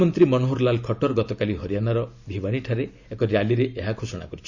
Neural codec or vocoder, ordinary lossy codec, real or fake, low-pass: none; none; real; 7.2 kHz